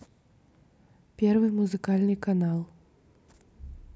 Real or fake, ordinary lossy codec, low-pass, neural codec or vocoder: real; none; none; none